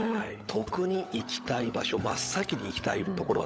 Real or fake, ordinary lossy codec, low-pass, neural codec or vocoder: fake; none; none; codec, 16 kHz, 16 kbps, FunCodec, trained on LibriTTS, 50 frames a second